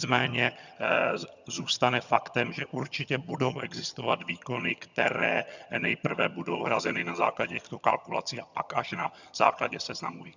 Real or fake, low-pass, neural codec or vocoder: fake; 7.2 kHz; vocoder, 22.05 kHz, 80 mel bands, HiFi-GAN